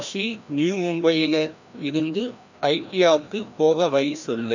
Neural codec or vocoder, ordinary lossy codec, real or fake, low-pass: codec, 16 kHz, 1 kbps, FreqCodec, larger model; none; fake; 7.2 kHz